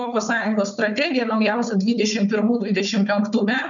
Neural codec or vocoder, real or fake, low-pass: codec, 16 kHz, 4 kbps, FunCodec, trained on Chinese and English, 50 frames a second; fake; 7.2 kHz